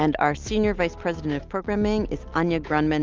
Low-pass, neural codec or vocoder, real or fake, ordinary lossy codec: 7.2 kHz; none; real; Opus, 24 kbps